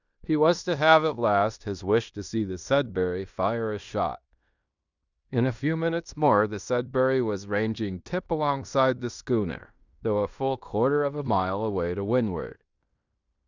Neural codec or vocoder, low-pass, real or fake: codec, 16 kHz in and 24 kHz out, 0.9 kbps, LongCat-Audio-Codec, fine tuned four codebook decoder; 7.2 kHz; fake